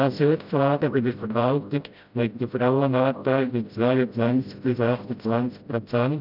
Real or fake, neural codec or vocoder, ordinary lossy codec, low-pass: fake; codec, 16 kHz, 0.5 kbps, FreqCodec, smaller model; none; 5.4 kHz